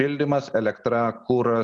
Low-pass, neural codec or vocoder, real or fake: 10.8 kHz; none; real